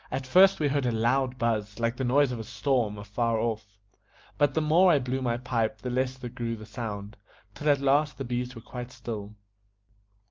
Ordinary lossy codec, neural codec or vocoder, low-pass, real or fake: Opus, 32 kbps; none; 7.2 kHz; real